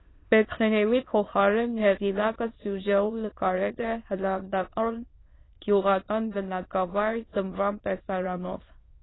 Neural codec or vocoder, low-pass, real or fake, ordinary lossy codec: autoencoder, 22.05 kHz, a latent of 192 numbers a frame, VITS, trained on many speakers; 7.2 kHz; fake; AAC, 16 kbps